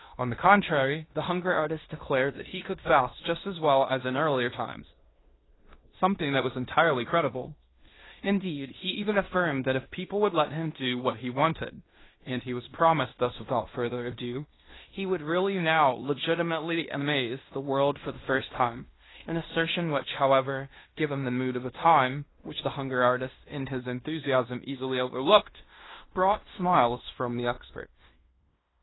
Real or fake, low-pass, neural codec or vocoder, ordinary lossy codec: fake; 7.2 kHz; codec, 16 kHz in and 24 kHz out, 0.9 kbps, LongCat-Audio-Codec, fine tuned four codebook decoder; AAC, 16 kbps